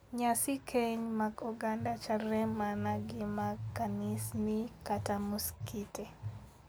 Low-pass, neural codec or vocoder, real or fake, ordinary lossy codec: none; none; real; none